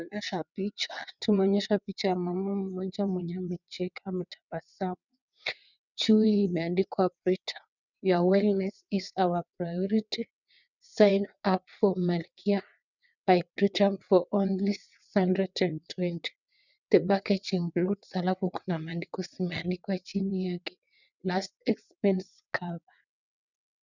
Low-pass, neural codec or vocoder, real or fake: 7.2 kHz; vocoder, 22.05 kHz, 80 mel bands, WaveNeXt; fake